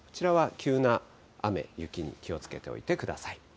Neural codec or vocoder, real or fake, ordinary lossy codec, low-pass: none; real; none; none